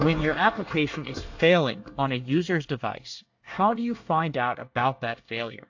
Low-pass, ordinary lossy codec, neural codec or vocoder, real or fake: 7.2 kHz; AAC, 48 kbps; codec, 24 kHz, 1 kbps, SNAC; fake